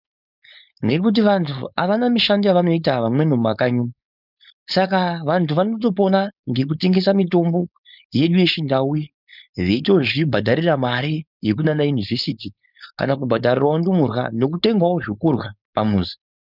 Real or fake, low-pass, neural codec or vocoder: fake; 5.4 kHz; codec, 16 kHz, 4.8 kbps, FACodec